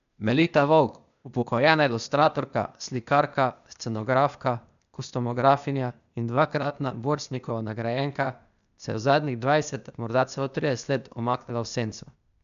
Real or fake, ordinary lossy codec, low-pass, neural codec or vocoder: fake; none; 7.2 kHz; codec, 16 kHz, 0.8 kbps, ZipCodec